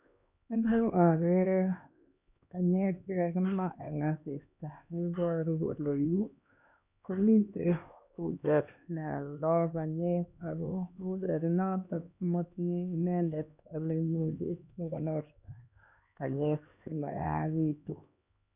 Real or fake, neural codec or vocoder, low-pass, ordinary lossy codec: fake; codec, 16 kHz, 2 kbps, X-Codec, HuBERT features, trained on LibriSpeech; 3.6 kHz; none